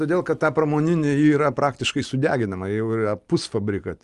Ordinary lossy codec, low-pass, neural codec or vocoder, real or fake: Opus, 32 kbps; 10.8 kHz; none; real